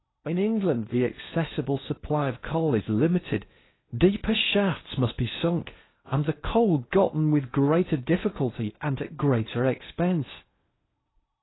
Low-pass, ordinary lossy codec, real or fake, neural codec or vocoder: 7.2 kHz; AAC, 16 kbps; fake; codec, 16 kHz in and 24 kHz out, 0.8 kbps, FocalCodec, streaming, 65536 codes